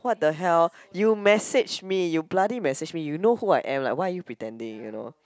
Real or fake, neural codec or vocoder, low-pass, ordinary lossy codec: real; none; none; none